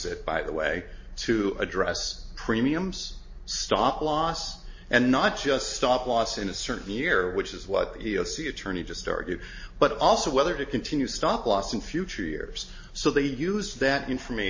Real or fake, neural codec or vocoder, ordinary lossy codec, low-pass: real; none; MP3, 32 kbps; 7.2 kHz